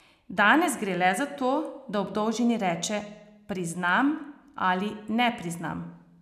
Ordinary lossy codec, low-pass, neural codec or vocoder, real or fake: none; 14.4 kHz; none; real